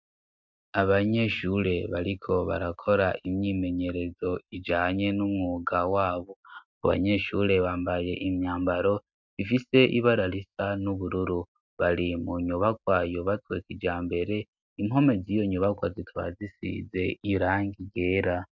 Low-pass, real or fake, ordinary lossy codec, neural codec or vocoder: 7.2 kHz; real; MP3, 64 kbps; none